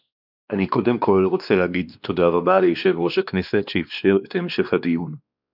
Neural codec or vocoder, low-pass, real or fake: codec, 16 kHz, 2 kbps, X-Codec, WavLM features, trained on Multilingual LibriSpeech; 5.4 kHz; fake